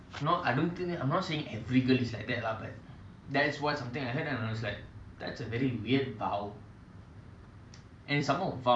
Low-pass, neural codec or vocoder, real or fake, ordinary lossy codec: 9.9 kHz; vocoder, 44.1 kHz, 128 mel bands every 256 samples, BigVGAN v2; fake; none